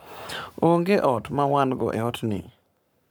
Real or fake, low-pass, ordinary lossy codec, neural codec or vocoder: fake; none; none; codec, 44.1 kHz, 7.8 kbps, Pupu-Codec